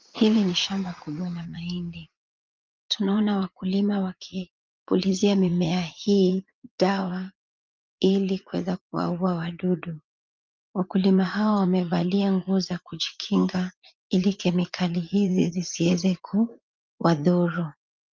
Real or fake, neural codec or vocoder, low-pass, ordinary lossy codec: fake; vocoder, 44.1 kHz, 80 mel bands, Vocos; 7.2 kHz; Opus, 24 kbps